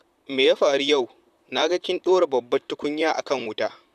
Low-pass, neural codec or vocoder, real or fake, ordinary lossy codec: 14.4 kHz; vocoder, 44.1 kHz, 128 mel bands, Pupu-Vocoder; fake; none